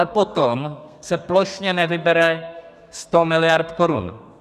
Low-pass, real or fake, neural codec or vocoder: 14.4 kHz; fake; codec, 32 kHz, 1.9 kbps, SNAC